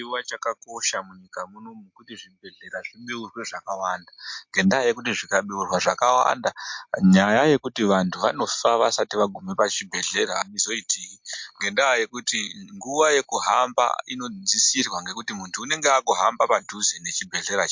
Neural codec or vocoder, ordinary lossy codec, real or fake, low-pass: none; MP3, 48 kbps; real; 7.2 kHz